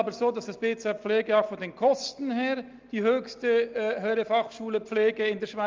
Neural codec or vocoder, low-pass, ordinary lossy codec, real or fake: none; 7.2 kHz; Opus, 24 kbps; real